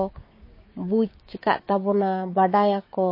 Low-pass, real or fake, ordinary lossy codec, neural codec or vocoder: 5.4 kHz; fake; MP3, 24 kbps; codec, 16 kHz, 6 kbps, DAC